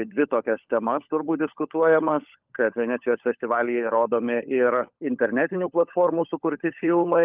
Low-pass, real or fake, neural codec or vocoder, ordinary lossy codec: 3.6 kHz; fake; codec, 16 kHz, 16 kbps, FunCodec, trained on LibriTTS, 50 frames a second; Opus, 24 kbps